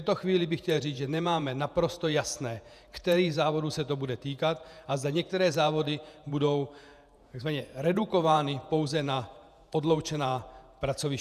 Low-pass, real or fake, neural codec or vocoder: 14.4 kHz; fake; vocoder, 48 kHz, 128 mel bands, Vocos